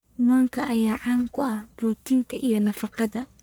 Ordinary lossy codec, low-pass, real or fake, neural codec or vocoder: none; none; fake; codec, 44.1 kHz, 1.7 kbps, Pupu-Codec